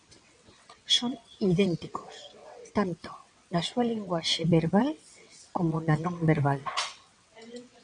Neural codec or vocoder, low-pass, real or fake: vocoder, 22.05 kHz, 80 mel bands, WaveNeXt; 9.9 kHz; fake